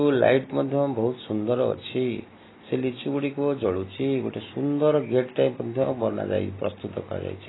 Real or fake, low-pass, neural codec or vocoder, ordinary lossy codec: real; 7.2 kHz; none; AAC, 16 kbps